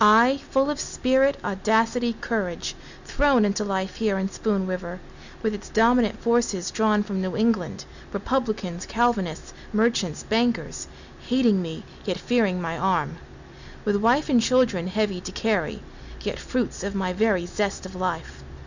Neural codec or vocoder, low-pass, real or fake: none; 7.2 kHz; real